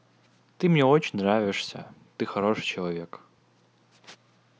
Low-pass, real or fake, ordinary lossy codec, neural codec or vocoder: none; real; none; none